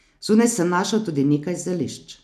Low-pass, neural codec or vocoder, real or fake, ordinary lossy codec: 14.4 kHz; none; real; none